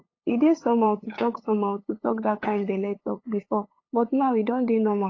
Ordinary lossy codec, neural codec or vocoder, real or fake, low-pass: AAC, 32 kbps; codec, 16 kHz, 8 kbps, FunCodec, trained on LibriTTS, 25 frames a second; fake; 7.2 kHz